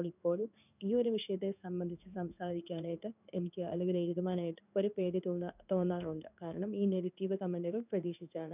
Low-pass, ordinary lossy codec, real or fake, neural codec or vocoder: 3.6 kHz; none; fake; codec, 16 kHz in and 24 kHz out, 1 kbps, XY-Tokenizer